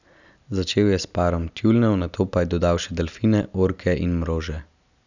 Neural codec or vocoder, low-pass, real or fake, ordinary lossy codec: none; 7.2 kHz; real; none